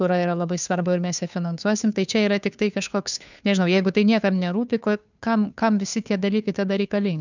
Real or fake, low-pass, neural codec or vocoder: fake; 7.2 kHz; codec, 16 kHz, 2 kbps, FunCodec, trained on Chinese and English, 25 frames a second